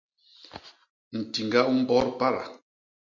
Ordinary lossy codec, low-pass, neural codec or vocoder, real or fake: MP3, 48 kbps; 7.2 kHz; none; real